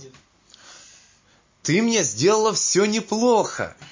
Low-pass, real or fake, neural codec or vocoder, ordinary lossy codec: 7.2 kHz; real; none; MP3, 32 kbps